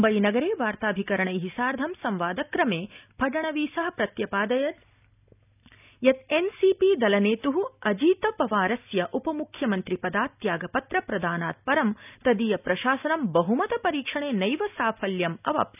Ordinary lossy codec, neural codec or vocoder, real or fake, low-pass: none; none; real; 3.6 kHz